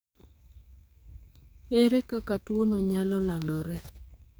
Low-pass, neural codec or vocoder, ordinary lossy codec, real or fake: none; codec, 44.1 kHz, 2.6 kbps, SNAC; none; fake